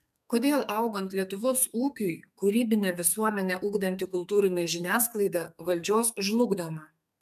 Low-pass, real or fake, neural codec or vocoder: 14.4 kHz; fake; codec, 32 kHz, 1.9 kbps, SNAC